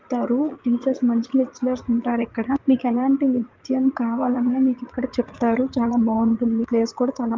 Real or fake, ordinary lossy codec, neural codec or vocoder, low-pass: fake; Opus, 32 kbps; vocoder, 44.1 kHz, 80 mel bands, Vocos; 7.2 kHz